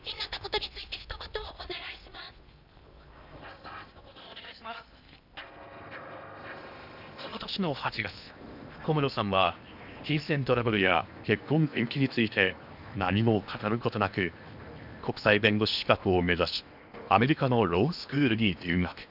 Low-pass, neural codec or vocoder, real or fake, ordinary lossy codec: 5.4 kHz; codec, 16 kHz in and 24 kHz out, 0.8 kbps, FocalCodec, streaming, 65536 codes; fake; none